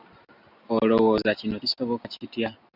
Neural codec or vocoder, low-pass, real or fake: none; 5.4 kHz; real